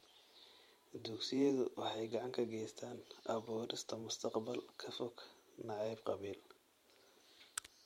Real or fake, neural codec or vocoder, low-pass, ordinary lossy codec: fake; vocoder, 44.1 kHz, 128 mel bands every 512 samples, BigVGAN v2; 19.8 kHz; MP3, 64 kbps